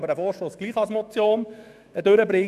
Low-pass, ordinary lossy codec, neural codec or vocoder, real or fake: 14.4 kHz; none; autoencoder, 48 kHz, 128 numbers a frame, DAC-VAE, trained on Japanese speech; fake